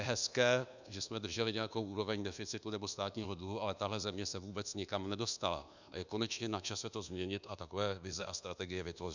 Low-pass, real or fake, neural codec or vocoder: 7.2 kHz; fake; codec, 24 kHz, 1.2 kbps, DualCodec